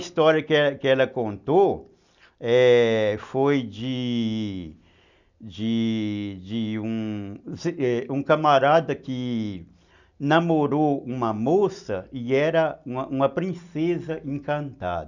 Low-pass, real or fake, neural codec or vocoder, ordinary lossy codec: 7.2 kHz; real; none; none